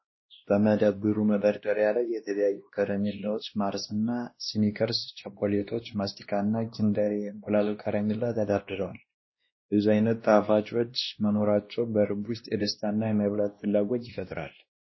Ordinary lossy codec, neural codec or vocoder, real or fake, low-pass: MP3, 24 kbps; codec, 16 kHz, 1 kbps, X-Codec, WavLM features, trained on Multilingual LibriSpeech; fake; 7.2 kHz